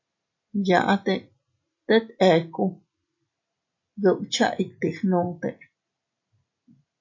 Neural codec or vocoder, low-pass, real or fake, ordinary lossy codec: none; 7.2 kHz; real; AAC, 48 kbps